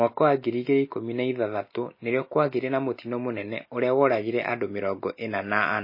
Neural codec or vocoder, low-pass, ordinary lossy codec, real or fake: none; 5.4 kHz; MP3, 24 kbps; real